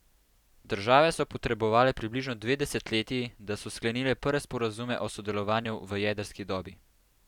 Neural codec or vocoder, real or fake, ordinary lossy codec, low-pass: vocoder, 44.1 kHz, 128 mel bands every 256 samples, BigVGAN v2; fake; none; 19.8 kHz